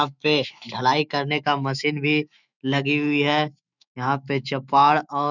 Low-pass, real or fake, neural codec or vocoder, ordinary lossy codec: 7.2 kHz; fake; autoencoder, 48 kHz, 128 numbers a frame, DAC-VAE, trained on Japanese speech; none